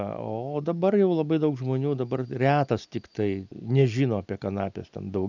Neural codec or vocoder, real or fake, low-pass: none; real; 7.2 kHz